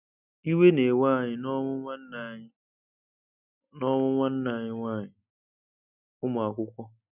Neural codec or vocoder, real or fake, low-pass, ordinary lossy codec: none; real; 3.6 kHz; none